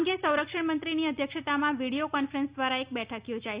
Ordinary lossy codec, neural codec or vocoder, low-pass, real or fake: Opus, 64 kbps; none; 3.6 kHz; real